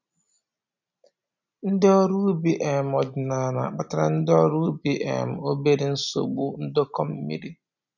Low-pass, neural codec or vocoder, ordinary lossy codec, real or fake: 7.2 kHz; none; none; real